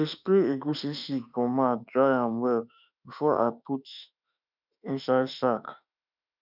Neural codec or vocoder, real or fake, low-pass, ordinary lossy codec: autoencoder, 48 kHz, 32 numbers a frame, DAC-VAE, trained on Japanese speech; fake; 5.4 kHz; none